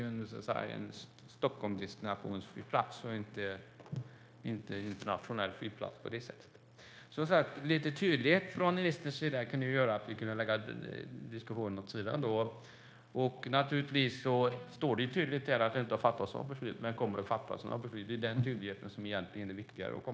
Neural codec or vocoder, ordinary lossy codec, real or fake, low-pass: codec, 16 kHz, 0.9 kbps, LongCat-Audio-Codec; none; fake; none